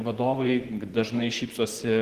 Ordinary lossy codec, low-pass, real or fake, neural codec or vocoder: Opus, 16 kbps; 14.4 kHz; fake; vocoder, 44.1 kHz, 128 mel bands, Pupu-Vocoder